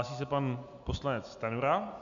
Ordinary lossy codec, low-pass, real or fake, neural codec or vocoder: AAC, 64 kbps; 7.2 kHz; real; none